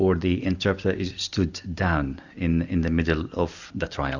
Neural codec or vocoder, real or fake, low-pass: none; real; 7.2 kHz